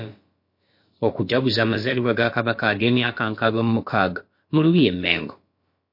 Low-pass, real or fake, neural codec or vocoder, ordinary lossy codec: 5.4 kHz; fake; codec, 16 kHz, about 1 kbps, DyCAST, with the encoder's durations; MP3, 32 kbps